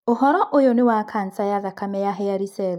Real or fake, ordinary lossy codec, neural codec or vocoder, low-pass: real; none; none; 19.8 kHz